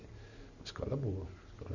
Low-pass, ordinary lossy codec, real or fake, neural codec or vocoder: 7.2 kHz; none; real; none